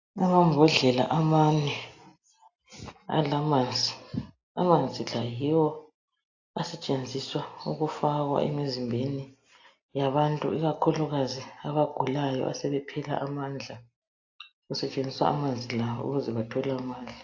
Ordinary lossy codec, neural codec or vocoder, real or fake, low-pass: AAC, 48 kbps; none; real; 7.2 kHz